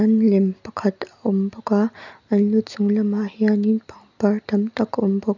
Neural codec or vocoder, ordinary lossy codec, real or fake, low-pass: none; none; real; 7.2 kHz